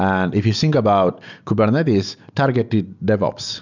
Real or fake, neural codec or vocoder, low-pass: real; none; 7.2 kHz